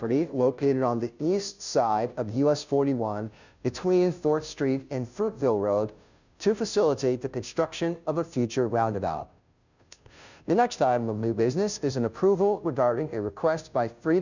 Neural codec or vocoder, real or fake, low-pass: codec, 16 kHz, 0.5 kbps, FunCodec, trained on Chinese and English, 25 frames a second; fake; 7.2 kHz